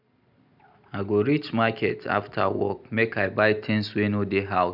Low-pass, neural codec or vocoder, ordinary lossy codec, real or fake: 5.4 kHz; none; none; real